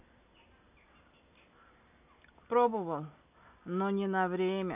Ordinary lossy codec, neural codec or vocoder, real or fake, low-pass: none; none; real; 3.6 kHz